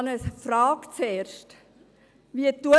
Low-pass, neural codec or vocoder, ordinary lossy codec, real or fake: none; none; none; real